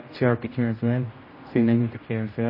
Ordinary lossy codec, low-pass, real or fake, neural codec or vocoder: MP3, 24 kbps; 5.4 kHz; fake; codec, 16 kHz, 0.5 kbps, X-Codec, HuBERT features, trained on general audio